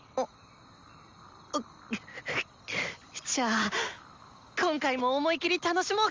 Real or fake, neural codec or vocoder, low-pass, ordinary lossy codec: real; none; 7.2 kHz; Opus, 32 kbps